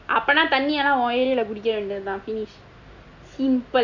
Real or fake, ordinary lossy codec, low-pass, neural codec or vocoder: real; none; 7.2 kHz; none